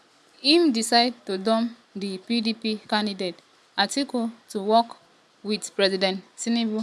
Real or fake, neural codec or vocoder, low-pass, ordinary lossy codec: real; none; none; none